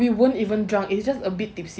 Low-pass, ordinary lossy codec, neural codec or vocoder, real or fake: none; none; none; real